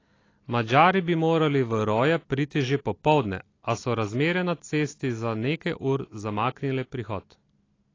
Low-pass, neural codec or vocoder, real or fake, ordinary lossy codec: 7.2 kHz; none; real; AAC, 32 kbps